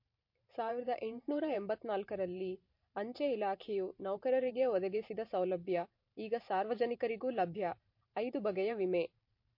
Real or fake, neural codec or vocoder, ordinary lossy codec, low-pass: fake; vocoder, 44.1 kHz, 128 mel bands every 512 samples, BigVGAN v2; MP3, 32 kbps; 5.4 kHz